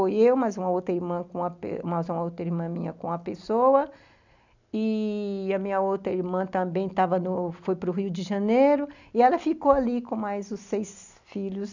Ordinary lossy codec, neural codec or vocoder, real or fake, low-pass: none; none; real; 7.2 kHz